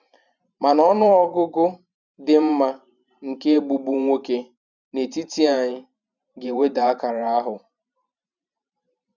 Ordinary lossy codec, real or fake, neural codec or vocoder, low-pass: none; fake; vocoder, 44.1 kHz, 128 mel bands every 512 samples, BigVGAN v2; 7.2 kHz